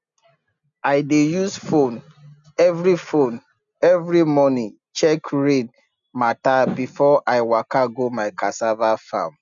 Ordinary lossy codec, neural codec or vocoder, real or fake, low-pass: none; none; real; 7.2 kHz